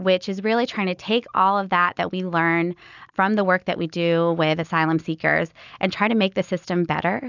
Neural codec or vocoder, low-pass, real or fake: none; 7.2 kHz; real